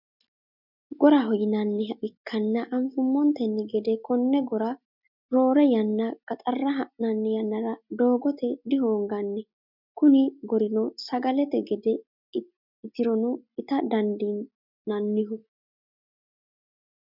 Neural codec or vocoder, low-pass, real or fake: none; 5.4 kHz; real